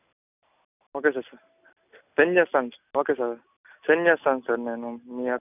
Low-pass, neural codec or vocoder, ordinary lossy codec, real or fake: 3.6 kHz; none; none; real